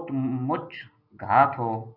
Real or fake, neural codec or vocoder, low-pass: real; none; 5.4 kHz